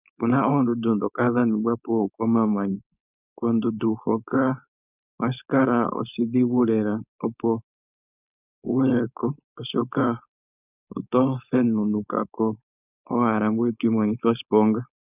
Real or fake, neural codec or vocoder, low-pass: fake; codec, 16 kHz, 4.8 kbps, FACodec; 3.6 kHz